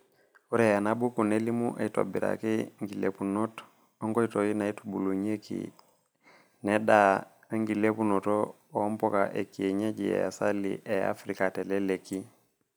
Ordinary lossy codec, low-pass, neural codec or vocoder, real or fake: none; none; none; real